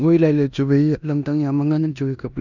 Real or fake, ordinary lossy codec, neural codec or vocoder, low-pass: fake; none; codec, 16 kHz in and 24 kHz out, 0.9 kbps, LongCat-Audio-Codec, four codebook decoder; 7.2 kHz